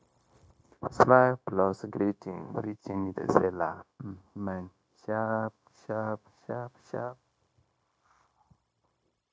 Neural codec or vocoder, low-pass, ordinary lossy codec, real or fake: codec, 16 kHz, 0.9 kbps, LongCat-Audio-Codec; none; none; fake